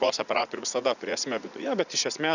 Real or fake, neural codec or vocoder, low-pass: fake; vocoder, 44.1 kHz, 80 mel bands, Vocos; 7.2 kHz